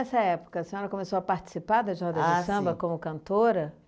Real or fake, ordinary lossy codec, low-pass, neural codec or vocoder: real; none; none; none